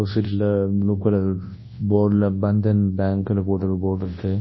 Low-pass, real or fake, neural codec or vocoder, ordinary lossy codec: 7.2 kHz; fake; codec, 24 kHz, 0.9 kbps, WavTokenizer, large speech release; MP3, 24 kbps